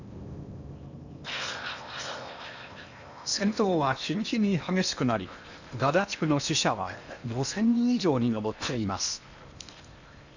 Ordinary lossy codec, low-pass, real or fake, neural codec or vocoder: none; 7.2 kHz; fake; codec, 16 kHz in and 24 kHz out, 0.8 kbps, FocalCodec, streaming, 65536 codes